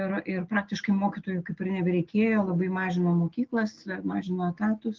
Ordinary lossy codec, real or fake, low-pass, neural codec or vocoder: Opus, 16 kbps; real; 7.2 kHz; none